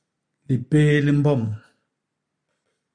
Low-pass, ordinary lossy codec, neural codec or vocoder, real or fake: 9.9 kHz; MP3, 96 kbps; none; real